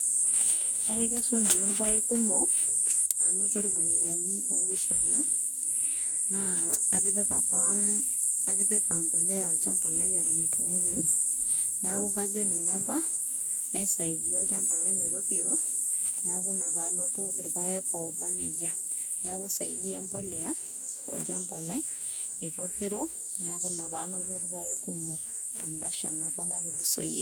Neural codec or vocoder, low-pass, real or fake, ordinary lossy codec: codec, 44.1 kHz, 2.6 kbps, DAC; none; fake; none